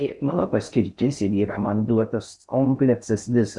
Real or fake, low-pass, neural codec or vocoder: fake; 10.8 kHz; codec, 16 kHz in and 24 kHz out, 0.6 kbps, FocalCodec, streaming, 4096 codes